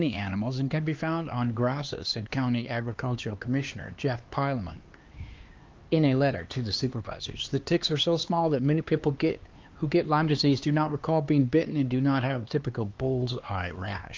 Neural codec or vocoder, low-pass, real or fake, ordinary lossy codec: codec, 16 kHz, 2 kbps, X-Codec, HuBERT features, trained on LibriSpeech; 7.2 kHz; fake; Opus, 24 kbps